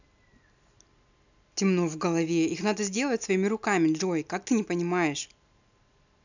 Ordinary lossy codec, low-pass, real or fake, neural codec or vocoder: none; 7.2 kHz; real; none